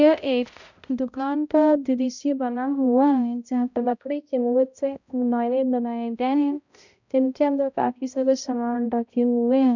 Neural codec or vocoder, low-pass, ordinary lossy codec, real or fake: codec, 16 kHz, 0.5 kbps, X-Codec, HuBERT features, trained on balanced general audio; 7.2 kHz; none; fake